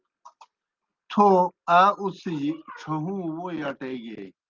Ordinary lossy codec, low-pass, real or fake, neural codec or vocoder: Opus, 32 kbps; 7.2 kHz; real; none